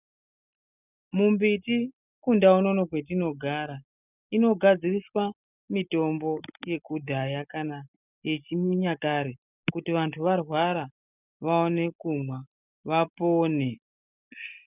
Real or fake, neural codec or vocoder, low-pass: real; none; 3.6 kHz